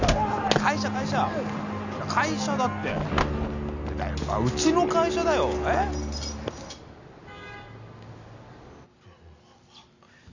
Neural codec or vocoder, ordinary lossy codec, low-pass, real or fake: none; none; 7.2 kHz; real